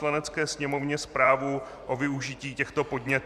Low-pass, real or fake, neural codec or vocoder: 14.4 kHz; fake; vocoder, 44.1 kHz, 128 mel bands every 512 samples, BigVGAN v2